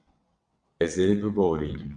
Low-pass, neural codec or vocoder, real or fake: 9.9 kHz; vocoder, 22.05 kHz, 80 mel bands, WaveNeXt; fake